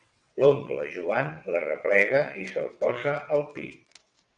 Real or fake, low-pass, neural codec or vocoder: fake; 9.9 kHz; vocoder, 22.05 kHz, 80 mel bands, WaveNeXt